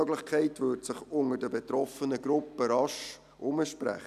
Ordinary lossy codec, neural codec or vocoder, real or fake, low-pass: none; none; real; 14.4 kHz